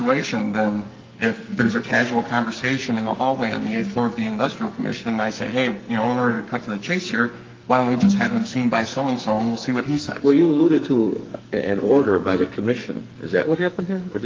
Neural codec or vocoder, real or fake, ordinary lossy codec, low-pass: codec, 44.1 kHz, 2.6 kbps, SNAC; fake; Opus, 24 kbps; 7.2 kHz